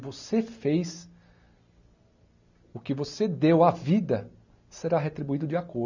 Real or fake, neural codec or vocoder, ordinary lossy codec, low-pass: real; none; none; 7.2 kHz